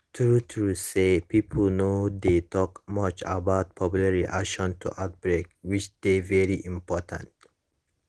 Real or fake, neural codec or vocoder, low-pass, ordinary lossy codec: real; none; 10.8 kHz; Opus, 16 kbps